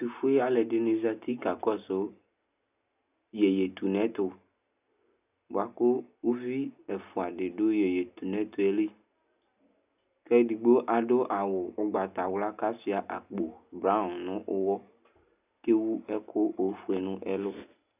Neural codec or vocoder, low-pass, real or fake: none; 3.6 kHz; real